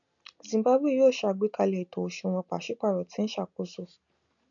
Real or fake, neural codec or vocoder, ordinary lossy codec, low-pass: real; none; none; 7.2 kHz